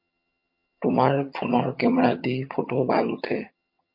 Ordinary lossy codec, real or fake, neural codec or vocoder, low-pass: MP3, 32 kbps; fake; vocoder, 22.05 kHz, 80 mel bands, HiFi-GAN; 5.4 kHz